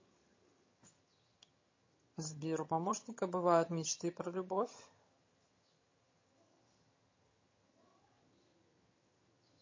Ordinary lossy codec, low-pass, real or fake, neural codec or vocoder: MP3, 32 kbps; 7.2 kHz; fake; vocoder, 22.05 kHz, 80 mel bands, HiFi-GAN